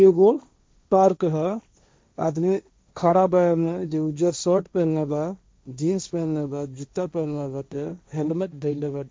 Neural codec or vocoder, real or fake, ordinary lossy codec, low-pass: codec, 16 kHz, 1.1 kbps, Voila-Tokenizer; fake; none; none